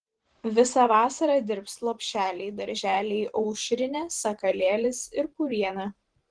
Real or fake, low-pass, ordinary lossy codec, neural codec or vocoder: fake; 9.9 kHz; Opus, 16 kbps; vocoder, 44.1 kHz, 128 mel bands every 512 samples, BigVGAN v2